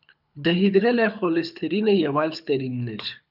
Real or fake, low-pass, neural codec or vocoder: fake; 5.4 kHz; codec, 24 kHz, 6 kbps, HILCodec